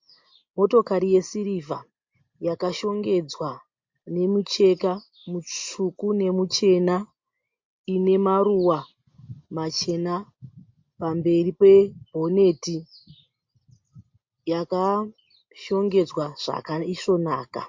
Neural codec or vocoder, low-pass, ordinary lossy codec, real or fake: none; 7.2 kHz; MP3, 48 kbps; real